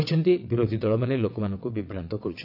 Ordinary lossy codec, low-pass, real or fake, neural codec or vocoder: AAC, 48 kbps; 5.4 kHz; fake; vocoder, 22.05 kHz, 80 mel bands, WaveNeXt